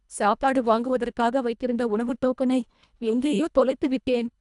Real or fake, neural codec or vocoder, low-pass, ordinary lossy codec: fake; codec, 24 kHz, 1.5 kbps, HILCodec; 10.8 kHz; none